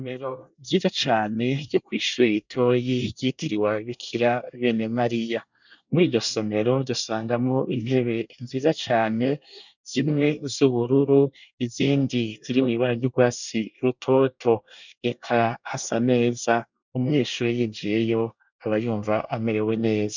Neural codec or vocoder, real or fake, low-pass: codec, 24 kHz, 1 kbps, SNAC; fake; 7.2 kHz